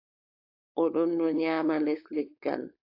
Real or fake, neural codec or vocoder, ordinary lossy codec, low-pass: fake; codec, 16 kHz, 4.8 kbps, FACodec; AAC, 32 kbps; 5.4 kHz